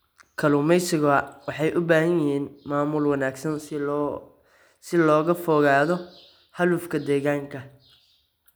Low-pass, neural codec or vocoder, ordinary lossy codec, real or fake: none; none; none; real